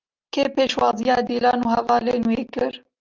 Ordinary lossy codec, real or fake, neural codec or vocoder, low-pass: Opus, 24 kbps; real; none; 7.2 kHz